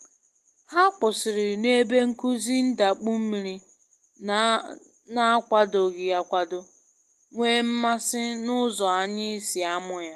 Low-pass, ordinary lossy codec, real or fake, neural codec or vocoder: 14.4 kHz; Opus, 24 kbps; real; none